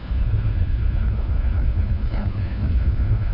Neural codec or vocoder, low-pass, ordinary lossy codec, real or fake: codec, 16 kHz, 1 kbps, FunCodec, trained on LibriTTS, 50 frames a second; 5.4 kHz; none; fake